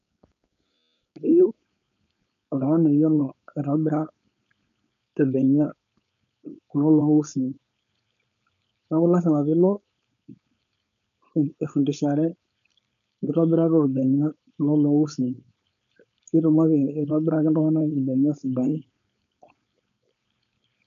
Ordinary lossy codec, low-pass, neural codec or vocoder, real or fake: none; 7.2 kHz; codec, 16 kHz, 4.8 kbps, FACodec; fake